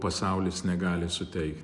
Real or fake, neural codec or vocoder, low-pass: real; none; 10.8 kHz